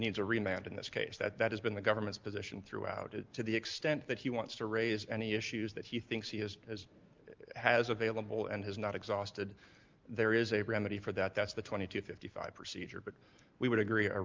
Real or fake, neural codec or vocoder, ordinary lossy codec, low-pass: real; none; Opus, 24 kbps; 7.2 kHz